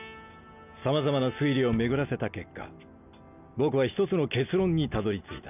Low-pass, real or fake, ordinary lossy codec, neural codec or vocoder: 3.6 kHz; real; none; none